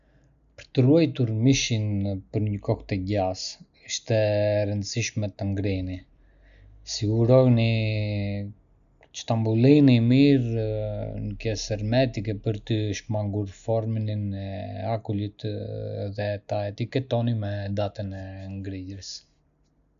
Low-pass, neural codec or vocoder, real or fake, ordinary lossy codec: 7.2 kHz; none; real; none